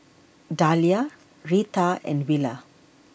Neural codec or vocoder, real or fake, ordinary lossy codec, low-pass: none; real; none; none